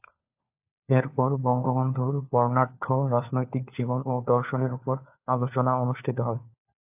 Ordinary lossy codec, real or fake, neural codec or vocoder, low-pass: AAC, 32 kbps; fake; codec, 16 kHz, 4 kbps, FunCodec, trained on LibriTTS, 50 frames a second; 3.6 kHz